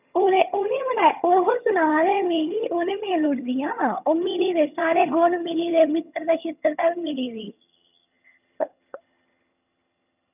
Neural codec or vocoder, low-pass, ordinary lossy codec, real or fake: vocoder, 22.05 kHz, 80 mel bands, HiFi-GAN; 3.6 kHz; none; fake